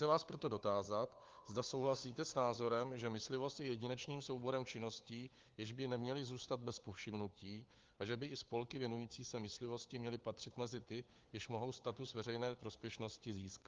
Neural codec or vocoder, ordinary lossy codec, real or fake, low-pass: codec, 16 kHz, 4 kbps, FunCodec, trained on LibriTTS, 50 frames a second; Opus, 32 kbps; fake; 7.2 kHz